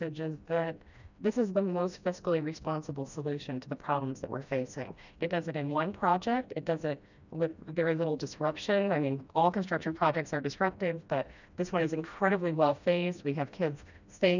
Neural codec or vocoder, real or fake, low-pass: codec, 16 kHz, 1 kbps, FreqCodec, smaller model; fake; 7.2 kHz